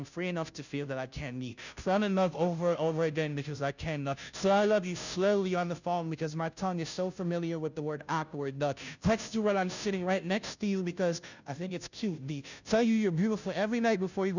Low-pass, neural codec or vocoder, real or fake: 7.2 kHz; codec, 16 kHz, 0.5 kbps, FunCodec, trained on Chinese and English, 25 frames a second; fake